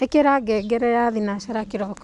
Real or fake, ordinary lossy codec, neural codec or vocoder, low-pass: real; none; none; 10.8 kHz